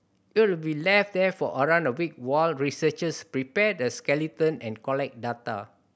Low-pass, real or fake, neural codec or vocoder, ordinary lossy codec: none; real; none; none